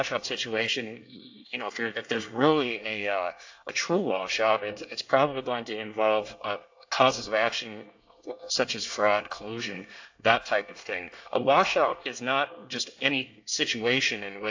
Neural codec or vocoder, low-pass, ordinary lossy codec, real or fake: codec, 24 kHz, 1 kbps, SNAC; 7.2 kHz; AAC, 48 kbps; fake